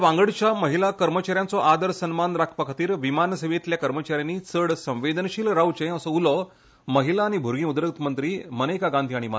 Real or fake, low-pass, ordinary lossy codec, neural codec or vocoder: real; none; none; none